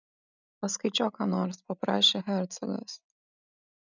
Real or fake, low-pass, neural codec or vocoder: real; 7.2 kHz; none